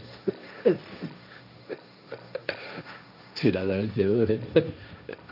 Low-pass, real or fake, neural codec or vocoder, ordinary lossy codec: 5.4 kHz; fake; codec, 16 kHz, 1.1 kbps, Voila-Tokenizer; none